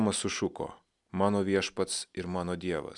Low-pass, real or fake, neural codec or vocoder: 10.8 kHz; real; none